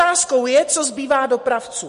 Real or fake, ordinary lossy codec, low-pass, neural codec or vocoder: real; MP3, 48 kbps; 10.8 kHz; none